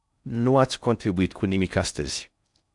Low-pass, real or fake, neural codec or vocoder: 10.8 kHz; fake; codec, 16 kHz in and 24 kHz out, 0.6 kbps, FocalCodec, streaming, 4096 codes